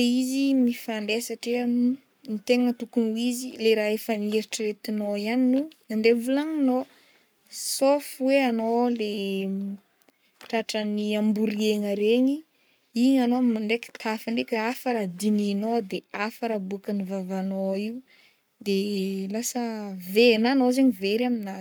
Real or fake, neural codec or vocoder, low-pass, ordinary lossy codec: fake; codec, 44.1 kHz, 7.8 kbps, Pupu-Codec; none; none